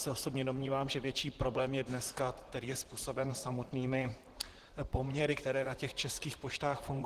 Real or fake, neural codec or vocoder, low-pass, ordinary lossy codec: fake; vocoder, 44.1 kHz, 128 mel bands, Pupu-Vocoder; 14.4 kHz; Opus, 16 kbps